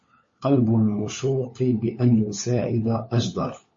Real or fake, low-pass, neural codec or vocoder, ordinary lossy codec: fake; 7.2 kHz; codec, 16 kHz, 4 kbps, FunCodec, trained on LibriTTS, 50 frames a second; MP3, 32 kbps